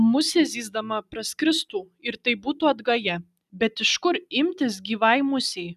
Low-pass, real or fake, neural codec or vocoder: 14.4 kHz; real; none